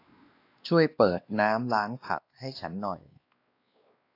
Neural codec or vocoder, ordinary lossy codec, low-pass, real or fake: codec, 16 kHz, 2 kbps, X-Codec, WavLM features, trained on Multilingual LibriSpeech; AAC, 32 kbps; 5.4 kHz; fake